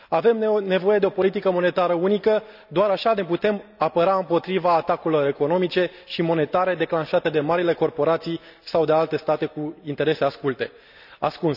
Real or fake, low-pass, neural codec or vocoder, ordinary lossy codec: real; 5.4 kHz; none; none